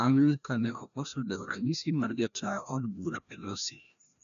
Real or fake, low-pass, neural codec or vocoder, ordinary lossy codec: fake; 7.2 kHz; codec, 16 kHz, 1 kbps, FreqCodec, larger model; none